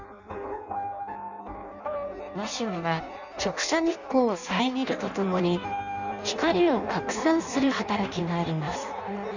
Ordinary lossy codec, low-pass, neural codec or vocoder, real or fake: none; 7.2 kHz; codec, 16 kHz in and 24 kHz out, 0.6 kbps, FireRedTTS-2 codec; fake